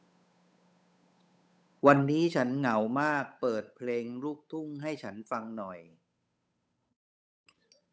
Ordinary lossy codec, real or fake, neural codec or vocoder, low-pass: none; fake; codec, 16 kHz, 8 kbps, FunCodec, trained on Chinese and English, 25 frames a second; none